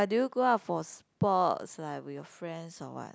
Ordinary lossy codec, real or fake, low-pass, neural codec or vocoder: none; real; none; none